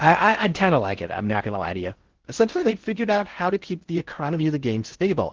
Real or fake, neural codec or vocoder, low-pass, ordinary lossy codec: fake; codec, 16 kHz in and 24 kHz out, 0.6 kbps, FocalCodec, streaming, 4096 codes; 7.2 kHz; Opus, 16 kbps